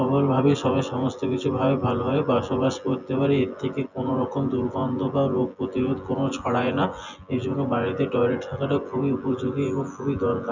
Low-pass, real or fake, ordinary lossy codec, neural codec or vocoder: 7.2 kHz; fake; none; vocoder, 24 kHz, 100 mel bands, Vocos